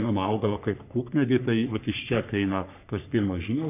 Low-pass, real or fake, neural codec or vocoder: 3.6 kHz; fake; codec, 44.1 kHz, 3.4 kbps, Pupu-Codec